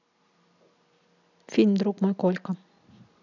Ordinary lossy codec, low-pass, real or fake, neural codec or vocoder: none; 7.2 kHz; real; none